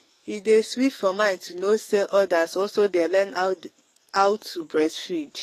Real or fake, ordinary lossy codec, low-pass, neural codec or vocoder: fake; AAC, 48 kbps; 14.4 kHz; codec, 44.1 kHz, 2.6 kbps, SNAC